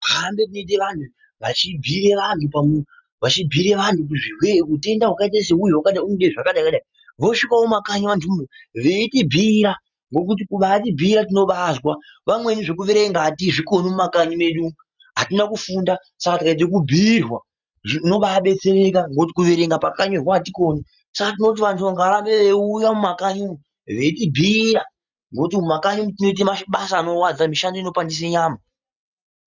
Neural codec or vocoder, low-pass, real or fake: none; 7.2 kHz; real